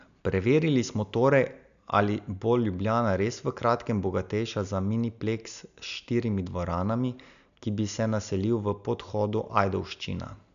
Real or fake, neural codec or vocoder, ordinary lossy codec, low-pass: real; none; none; 7.2 kHz